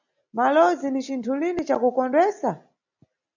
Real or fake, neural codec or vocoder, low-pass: real; none; 7.2 kHz